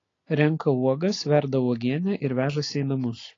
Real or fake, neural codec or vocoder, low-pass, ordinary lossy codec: real; none; 7.2 kHz; AAC, 32 kbps